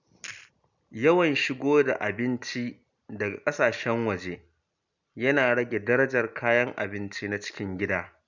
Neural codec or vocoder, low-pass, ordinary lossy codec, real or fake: none; 7.2 kHz; none; real